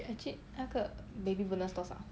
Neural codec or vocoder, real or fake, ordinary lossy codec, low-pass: none; real; none; none